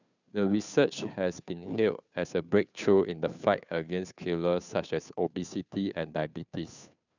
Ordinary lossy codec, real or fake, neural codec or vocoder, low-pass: none; fake; codec, 16 kHz, 2 kbps, FunCodec, trained on Chinese and English, 25 frames a second; 7.2 kHz